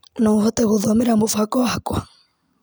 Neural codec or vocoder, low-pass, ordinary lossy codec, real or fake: none; none; none; real